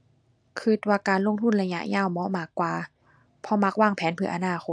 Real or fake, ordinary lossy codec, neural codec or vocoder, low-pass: real; AAC, 64 kbps; none; 9.9 kHz